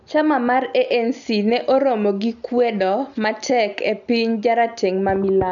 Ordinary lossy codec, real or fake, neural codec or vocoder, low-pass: none; real; none; 7.2 kHz